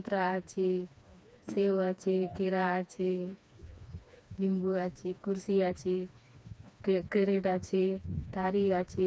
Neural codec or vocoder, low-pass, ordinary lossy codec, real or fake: codec, 16 kHz, 2 kbps, FreqCodec, smaller model; none; none; fake